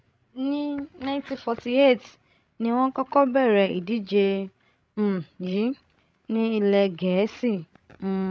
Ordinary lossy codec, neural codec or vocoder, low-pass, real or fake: none; codec, 16 kHz, 16 kbps, FreqCodec, larger model; none; fake